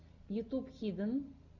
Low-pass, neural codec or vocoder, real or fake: 7.2 kHz; none; real